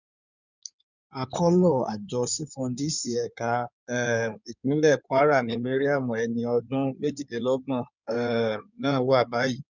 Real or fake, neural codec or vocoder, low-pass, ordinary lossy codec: fake; codec, 16 kHz in and 24 kHz out, 2.2 kbps, FireRedTTS-2 codec; 7.2 kHz; none